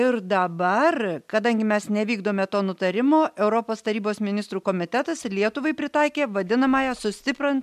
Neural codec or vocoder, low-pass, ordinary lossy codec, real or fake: none; 14.4 kHz; MP3, 96 kbps; real